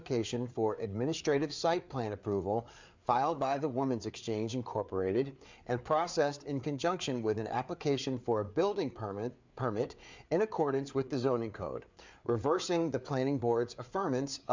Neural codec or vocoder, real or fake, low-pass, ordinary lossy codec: codec, 16 kHz, 4 kbps, FreqCodec, larger model; fake; 7.2 kHz; MP3, 64 kbps